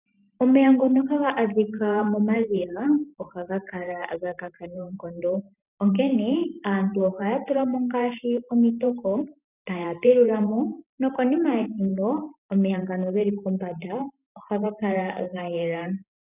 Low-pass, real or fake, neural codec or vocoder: 3.6 kHz; fake; vocoder, 44.1 kHz, 128 mel bands every 512 samples, BigVGAN v2